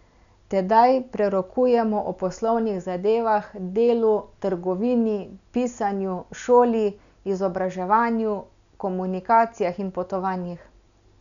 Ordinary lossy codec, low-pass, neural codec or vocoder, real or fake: none; 7.2 kHz; none; real